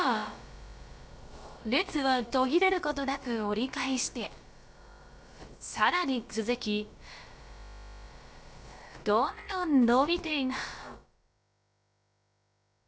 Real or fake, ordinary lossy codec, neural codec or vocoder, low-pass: fake; none; codec, 16 kHz, about 1 kbps, DyCAST, with the encoder's durations; none